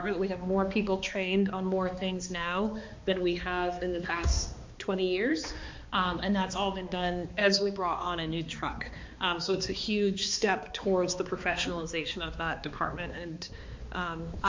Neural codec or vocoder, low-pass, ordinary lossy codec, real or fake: codec, 16 kHz, 2 kbps, X-Codec, HuBERT features, trained on balanced general audio; 7.2 kHz; MP3, 48 kbps; fake